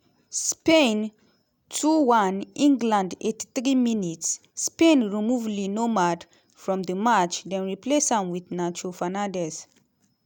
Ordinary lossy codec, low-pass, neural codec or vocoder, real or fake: none; none; none; real